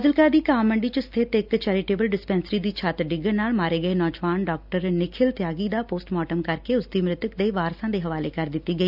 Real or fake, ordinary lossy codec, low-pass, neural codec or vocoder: real; none; 5.4 kHz; none